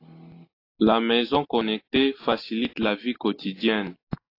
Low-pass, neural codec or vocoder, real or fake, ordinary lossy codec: 5.4 kHz; none; real; AAC, 32 kbps